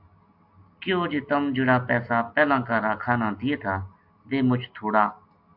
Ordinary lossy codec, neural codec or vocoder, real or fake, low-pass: AAC, 48 kbps; none; real; 5.4 kHz